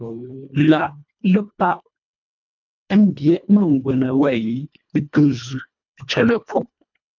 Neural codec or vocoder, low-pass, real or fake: codec, 24 kHz, 1.5 kbps, HILCodec; 7.2 kHz; fake